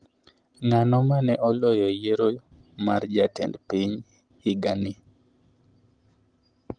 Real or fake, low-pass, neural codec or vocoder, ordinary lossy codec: real; 9.9 kHz; none; Opus, 24 kbps